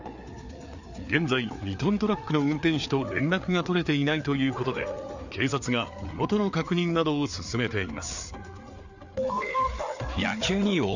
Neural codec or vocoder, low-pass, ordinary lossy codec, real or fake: codec, 16 kHz, 4 kbps, FreqCodec, larger model; 7.2 kHz; none; fake